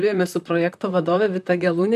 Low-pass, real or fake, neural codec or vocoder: 14.4 kHz; fake; vocoder, 44.1 kHz, 128 mel bands, Pupu-Vocoder